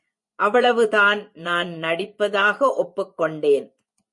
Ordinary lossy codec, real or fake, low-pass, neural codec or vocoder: MP3, 48 kbps; fake; 10.8 kHz; vocoder, 24 kHz, 100 mel bands, Vocos